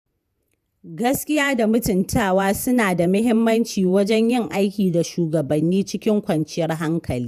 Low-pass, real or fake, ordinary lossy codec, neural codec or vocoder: 14.4 kHz; fake; none; vocoder, 48 kHz, 128 mel bands, Vocos